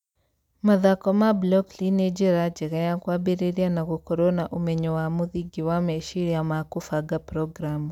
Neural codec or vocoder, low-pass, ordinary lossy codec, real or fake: none; 19.8 kHz; none; real